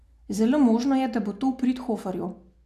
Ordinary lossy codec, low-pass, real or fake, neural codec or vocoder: none; 14.4 kHz; real; none